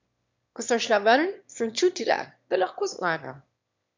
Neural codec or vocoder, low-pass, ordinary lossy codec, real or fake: autoencoder, 22.05 kHz, a latent of 192 numbers a frame, VITS, trained on one speaker; 7.2 kHz; MP3, 64 kbps; fake